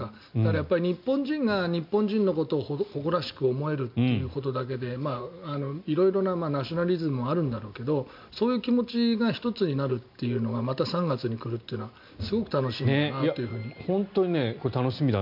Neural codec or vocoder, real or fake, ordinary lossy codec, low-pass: none; real; MP3, 48 kbps; 5.4 kHz